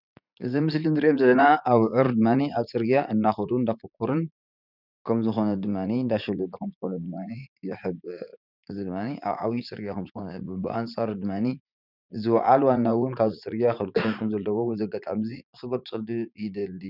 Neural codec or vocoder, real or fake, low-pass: vocoder, 44.1 kHz, 80 mel bands, Vocos; fake; 5.4 kHz